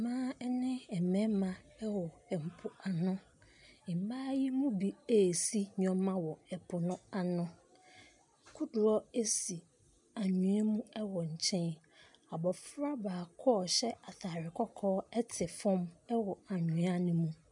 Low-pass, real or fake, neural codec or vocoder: 10.8 kHz; real; none